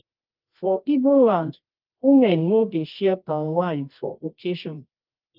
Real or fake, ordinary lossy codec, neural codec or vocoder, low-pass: fake; Opus, 32 kbps; codec, 24 kHz, 0.9 kbps, WavTokenizer, medium music audio release; 5.4 kHz